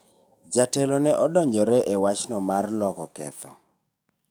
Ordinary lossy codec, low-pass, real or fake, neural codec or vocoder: none; none; fake; codec, 44.1 kHz, 7.8 kbps, DAC